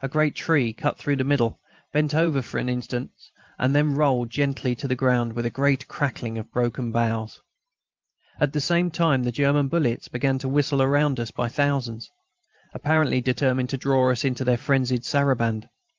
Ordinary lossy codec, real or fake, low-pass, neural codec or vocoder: Opus, 32 kbps; fake; 7.2 kHz; vocoder, 44.1 kHz, 128 mel bands every 512 samples, BigVGAN v2